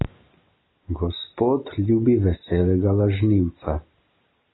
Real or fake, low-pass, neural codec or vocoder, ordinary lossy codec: real; 7.2 kHz; none; AAC, 16 kbps